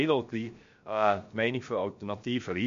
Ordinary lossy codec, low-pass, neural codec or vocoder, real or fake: MP3, 48 kbps; 7.2 kHz; codec, 16 kHz, about 1 kbps, DyCAST, with the encoder's durations; fake